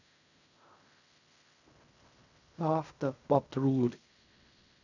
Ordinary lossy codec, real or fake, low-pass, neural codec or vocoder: none; fake; 7.2 kHz; codec, 16 kHz in and 24 kHz out, 0.4 kbps, LongCat-Audio-Codec, fine tuned four codebook decoder